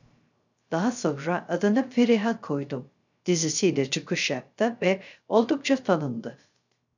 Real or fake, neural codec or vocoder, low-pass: fake; codec, 16 kHz, 0.3 kbps, FocalCodec; 7.2 kHz